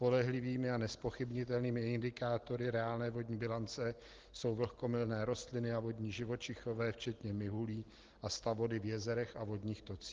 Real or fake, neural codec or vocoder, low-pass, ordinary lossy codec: real; none; 7.2 kHz; Opus, 16 kbps